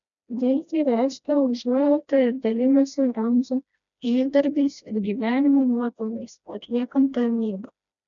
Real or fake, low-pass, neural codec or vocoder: fake; 7.2 kHz; codec, 16 kHz, 1 kbps, FreqCodec, smaller model